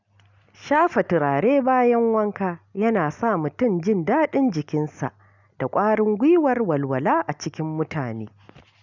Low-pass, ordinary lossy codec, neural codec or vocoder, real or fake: 7.2 kHz; none; none; real